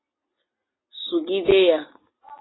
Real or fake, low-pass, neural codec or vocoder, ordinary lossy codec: real; 7.2 kHz; none; AAC, 16 kbps